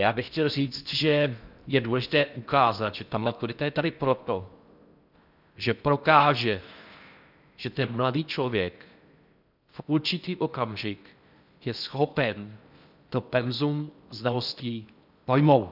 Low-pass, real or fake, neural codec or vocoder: 5.4 kHz; fake; codec, 16 kHz in and 24 kHz out, 0.6 kbps, FocalCodec, streaming, 4096 codes